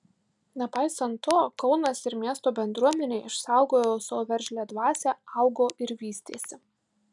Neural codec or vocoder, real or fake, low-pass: none; real; 10.8 kHz